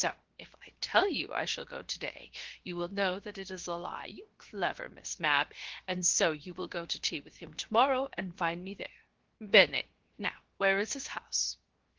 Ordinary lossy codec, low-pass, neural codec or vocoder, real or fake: Opus, 16 kbps; 7.2 kHz; codec, 16 kHz, 0.7 kbps, FocalCodec; fake